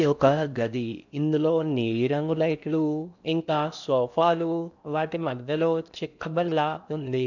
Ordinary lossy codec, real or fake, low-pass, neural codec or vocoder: none; fake; 7.2 kHz; codec, 16 kHz in and 24 kHz out, 0.6 kbps, FocalCodec, streaming, 4096 codes